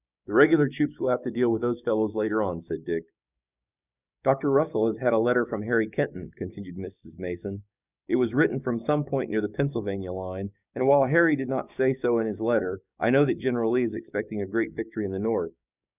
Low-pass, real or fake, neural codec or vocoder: 3.6 kHz; fake; codec, 16 kHz, 6 kbps, DAC